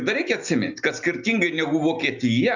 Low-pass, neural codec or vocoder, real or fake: 7.2 kHz; none; real